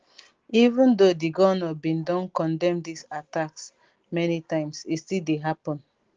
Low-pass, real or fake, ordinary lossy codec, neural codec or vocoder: 7.2 kHz; real; Opus, 16 kbps; none